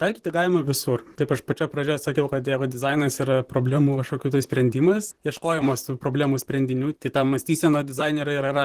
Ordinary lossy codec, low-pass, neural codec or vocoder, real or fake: Opus, 16 kbps; 14.4 kHz; vocoder, 44.1 kHz, 128 mel bands, Pupu-Vocoder; fake